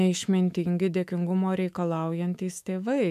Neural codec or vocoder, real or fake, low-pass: autoencoder, 48 kHz, 128 numbers a frame, DAC-VAE, trained on Japanese speech; fake; 14.4 kHz